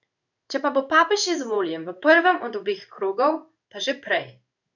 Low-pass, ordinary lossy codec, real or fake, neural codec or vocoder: 7.2 kHz; none; fake; codec, 16 kHz in and 24 kHz out, 1 kbps, XY-Tokenizer